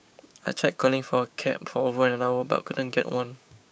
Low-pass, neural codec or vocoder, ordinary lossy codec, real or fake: none; codec, 16 kHz, 6 kbps, DAC; none; fake